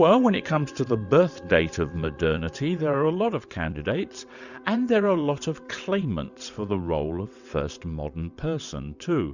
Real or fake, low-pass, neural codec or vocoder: fake; 7.2 kHz; vocoder, 22.05 kHz, 80 mel bands, WaveNeXt